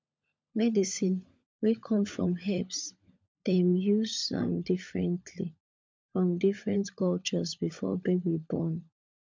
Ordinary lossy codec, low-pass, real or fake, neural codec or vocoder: none; none; fake; codec, 16 kHz, 16 kbps, FunCodec, trained on LibriTTS, 50 frames a second